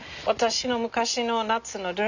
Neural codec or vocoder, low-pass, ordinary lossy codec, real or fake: none; 7.2 kHz; none; real